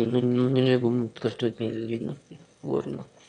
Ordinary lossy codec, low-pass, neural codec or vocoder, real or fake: none; 9.9 kHz; autoencoder, 22.05 kHz, a latent of 192 numbers a frame, VITS, trained on one speaker; fake